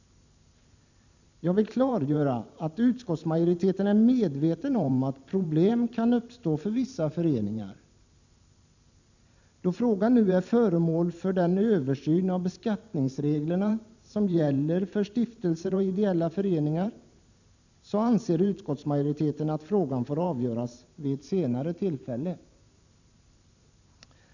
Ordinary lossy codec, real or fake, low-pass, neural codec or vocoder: none; fake; 7.2 kHz; vocoder, 44.1 kHz, 128 mel bands every 512 samples, BigVGAN v2